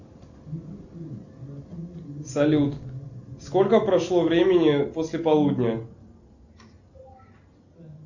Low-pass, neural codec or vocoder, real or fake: 7.2 kHz; none; real